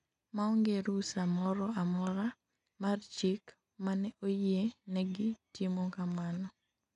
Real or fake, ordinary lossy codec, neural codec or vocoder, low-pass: real; none; none; 10.8 kHz